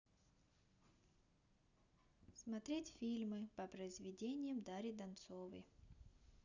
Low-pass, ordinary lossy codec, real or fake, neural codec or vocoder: 7.2 kHz; none; real; none